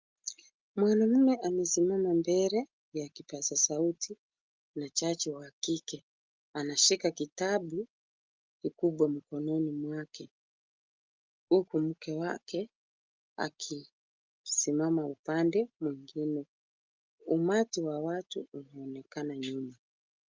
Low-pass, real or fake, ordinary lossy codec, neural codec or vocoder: 7.2 kHz; real; Opus, 32 kbps; none